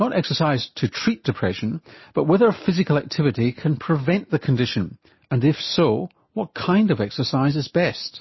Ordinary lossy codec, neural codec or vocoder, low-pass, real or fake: MP3, 24 kbps; none; 7.2 kHz; real